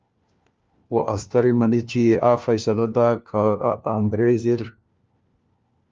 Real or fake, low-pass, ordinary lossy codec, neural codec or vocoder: fake; 7.2 kHz; Opus, 24 kbps; codec, 16 kHz, 1 kbps, FunCodec, trained on LibriTTS, 50 frames a second